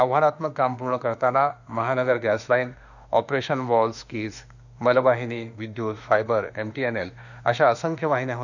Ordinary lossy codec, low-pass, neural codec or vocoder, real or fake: none; 7.2 kHz; autoencoder, 48 kHz, 32 numbers a frame, DAC-VAE, trained on Japanese speech; fake